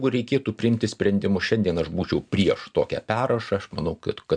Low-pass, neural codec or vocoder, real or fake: 9.9 kHz; none; real